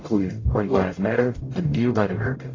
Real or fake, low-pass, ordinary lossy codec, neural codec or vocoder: fake; 7.2 kHz; AAC, 32 kbps; codec, 44.1 kHz, 0.9 kbps, DAC